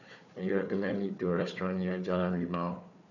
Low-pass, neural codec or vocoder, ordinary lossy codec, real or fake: 7.2 kHz; codec, 16 kHz, 4 kbps, FunCodec, trained on Chinese and English, 50 frames a second; none; fake